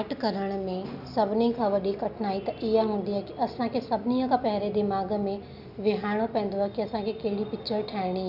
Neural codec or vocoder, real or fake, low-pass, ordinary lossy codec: none; real; 5.4 kHz; none